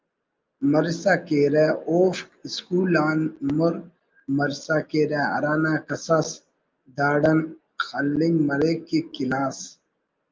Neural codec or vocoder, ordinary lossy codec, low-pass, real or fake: none; Opus, 24 kbps; 7.2 kHz; real